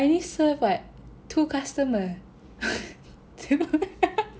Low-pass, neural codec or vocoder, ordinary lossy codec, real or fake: none; none; none; real